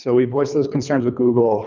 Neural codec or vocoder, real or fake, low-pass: codec, 24 kHz, 3 kbps, HILCodec; fake; 7.2 kHz